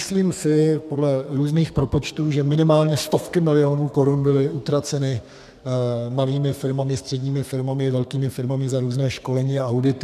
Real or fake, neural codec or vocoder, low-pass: fake; codec, 32 kHz, 1.9 kbps, SNAC; 14.4 kHz